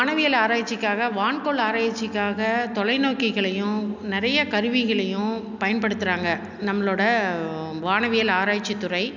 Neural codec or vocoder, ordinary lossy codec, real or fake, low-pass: none; none; real; 7.2 kHz